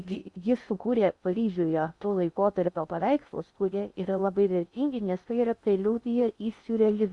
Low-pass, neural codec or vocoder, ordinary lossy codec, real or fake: 10.8 kHz; codec, 16 kHz in and 24 kHz out, 0.6 kbps, FocalCodec, streaming, 2048 codes; Opus, 64 kbps; fake